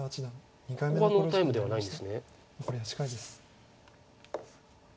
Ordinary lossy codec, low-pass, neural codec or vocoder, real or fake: none; none; none; real